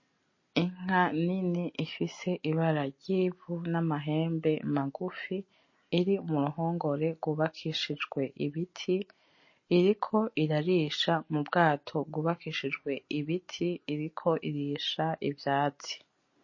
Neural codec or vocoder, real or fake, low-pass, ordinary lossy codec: none; real; 7.2 kHz; MP3, 32 kbps